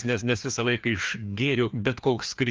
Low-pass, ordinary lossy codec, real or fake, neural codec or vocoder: 7.2 kHz; Opus, 24 kbps; fake; codec, 16 kHz, 2 kbps, FreqCodec, larger model